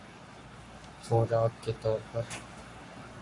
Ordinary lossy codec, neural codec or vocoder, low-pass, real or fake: MP3, 48 kbps; codec, 44.1 kHz, 7.8 kbps, Pupu-Codec; 10.8 kHz; fake